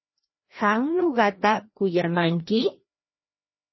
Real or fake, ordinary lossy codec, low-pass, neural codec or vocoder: fake; MP3, 24 kbps; 7.2 kHz; codec, 16 kHz, 1 kbps, FreqCodec, larger model